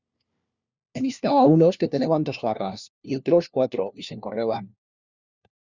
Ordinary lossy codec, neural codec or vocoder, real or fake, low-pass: Opus, 64 kbps; codec, 16 kHz, 1 kbps, FunCodec, trained on LibriTTS, 50 frames a second; fake; 7.2 kHz